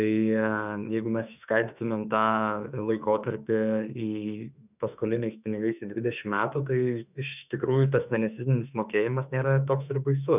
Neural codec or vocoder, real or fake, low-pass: autoencoder, 48 kHz, 32 numbers a frame, DAC-VAE, trained on Japanese speech; fake; 3.6 kHz